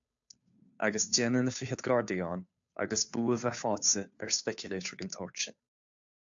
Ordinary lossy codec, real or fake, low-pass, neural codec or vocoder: AAC, 64 kbps; fake; 7.2 kHz; codec, 16 kHz, 2 kbps, FunCodec, trained on Chinese and English, 25 frames a second